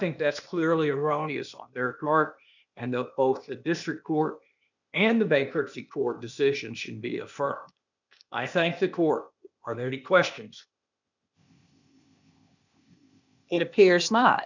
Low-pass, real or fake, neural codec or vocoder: 7.2 kHz; fake; codec, 16 kHz, 0.8 kbps, ZipCodec